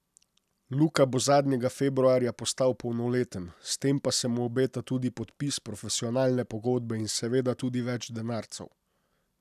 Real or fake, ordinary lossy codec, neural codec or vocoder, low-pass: real; none; none; 14.4 kHz